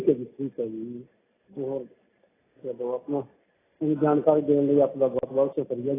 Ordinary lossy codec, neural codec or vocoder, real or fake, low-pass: AAC, 16 kbps; none; real; 3.6 kHz